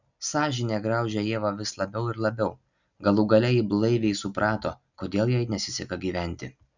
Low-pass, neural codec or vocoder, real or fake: 7.2 kHz; none; real